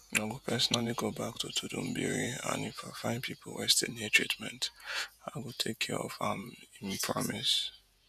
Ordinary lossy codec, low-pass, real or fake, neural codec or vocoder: none; 14.4 kHz; fake; vocoder, 48 kHz, 128 mel bands, Vocos